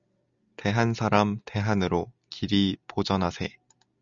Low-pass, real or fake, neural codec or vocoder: 7.2 kHz; real; none